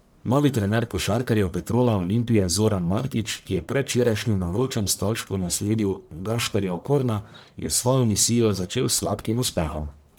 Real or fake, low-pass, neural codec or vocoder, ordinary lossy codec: fake; none; codec, 44.1 kHz, 1.7 kbps, Pupu-Codec; none